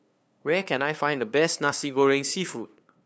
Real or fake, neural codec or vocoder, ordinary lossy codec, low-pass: fake; codec, 16 kHz, 2 kbps, FunCodec, trained on LibriTTS, 25 frames a second; none; none